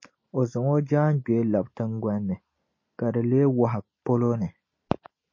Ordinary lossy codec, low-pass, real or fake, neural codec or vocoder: MP3, 32 kbps; 7.2 kHz; real; none